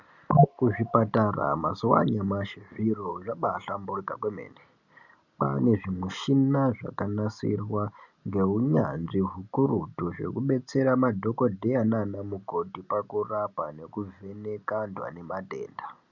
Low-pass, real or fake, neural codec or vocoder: 7.2 kHz; real; none